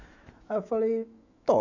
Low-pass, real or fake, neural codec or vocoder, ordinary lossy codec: 7.2 kHz; fake; autoencoder, 48 kHz, 128 numbers a frame, DAC-VAE, trained on Japanese speech; none